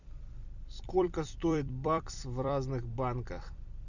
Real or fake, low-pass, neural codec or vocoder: fake; 7.2 kHz; vocoder, 44.1 kHz, 128 mel bands every 256 samples, BigVGAN v2